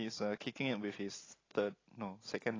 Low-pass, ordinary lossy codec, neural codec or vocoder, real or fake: 7.2 kHz; AAC, 32 kbps; none; real